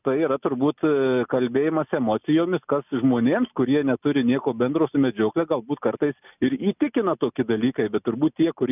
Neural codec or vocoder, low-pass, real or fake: none; 3.6 kHz; real